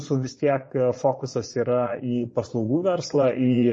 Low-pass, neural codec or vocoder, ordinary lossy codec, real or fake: 9.9 kHz; vocoder, 22.05 kHz, 80 mel bands, Vocos; MP3, 32 kbps; fake